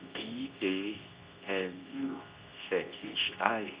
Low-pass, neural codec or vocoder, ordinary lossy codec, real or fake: 3.6 kHz; codec, 24 kHz, 0.9 kbps, WavTokenizer, medium speech release version 2; Opus, 64 kbps; fake